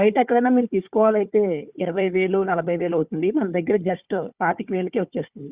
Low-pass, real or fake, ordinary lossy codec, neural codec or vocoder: 3.6 kHz; fake; Opus, 64 kbps; codec, 16 kHz, 4 kbps, FunCodec, trained on Chinese and English, 50 frames a second